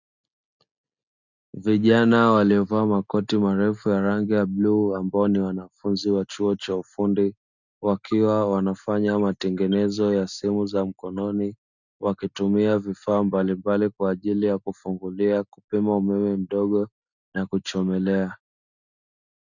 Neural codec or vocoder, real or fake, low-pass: none; real; 7.2 kHz